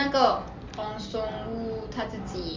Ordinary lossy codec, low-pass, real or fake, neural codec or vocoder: Opus, 32 kbps; 7.2 kHz; real; none